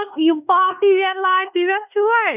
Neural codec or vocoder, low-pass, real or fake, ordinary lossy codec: codec, 16 kHz in and 24 kHz out, 0.9 kbps, LongCat-Audio-Codec, fine tuned four codebook decoder; 3.6 kHz; fake; none